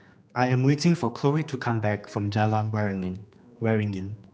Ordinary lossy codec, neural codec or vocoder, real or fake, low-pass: none; codec, 16 kHz, 2 kbps, X-Codec, HuBERT features, trained on general audio; fake; none